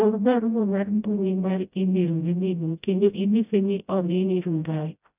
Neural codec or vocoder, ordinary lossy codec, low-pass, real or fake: codec, 16 kHz, 0.5 kbps, FreqCodec, smaller model; none; 3.6 kHz; fake